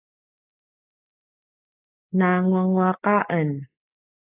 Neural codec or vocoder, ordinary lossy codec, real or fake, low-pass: none; AAC, 32 kbps; real; 3.6 kHz